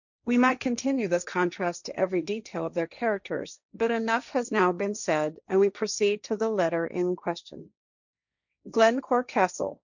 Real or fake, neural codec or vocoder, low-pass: fake; codec, 16 kHz, 1.1 kbps, Voila-Tokenizer; 7.2 kHz